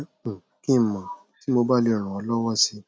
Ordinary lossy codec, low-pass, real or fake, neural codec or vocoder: none; none; real; none